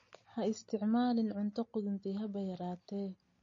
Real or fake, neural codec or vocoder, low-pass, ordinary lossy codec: real; none; 7.2 kHz; MP3, 32 kbps